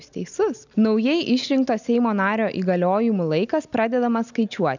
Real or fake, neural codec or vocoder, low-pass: real; none; 7.2 kHz